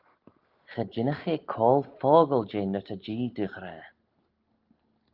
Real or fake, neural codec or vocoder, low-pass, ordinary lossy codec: real; none; 5.4 kHz; Opus, 32 kbps